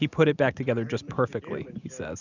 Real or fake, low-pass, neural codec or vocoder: real; 7.2 kHz; none